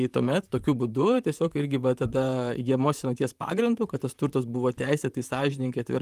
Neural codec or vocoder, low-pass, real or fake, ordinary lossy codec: vocoder, 44.1 kHz, 128 mel bands, Pupu-Vocoder; 14.4 kHz; fake; Opus, 32 kbps